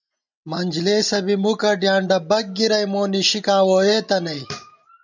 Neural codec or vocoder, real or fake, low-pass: none; real; 7.2 kHz